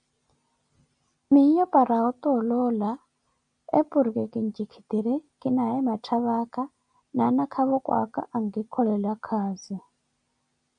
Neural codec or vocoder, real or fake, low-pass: none; real; 9.9 kHz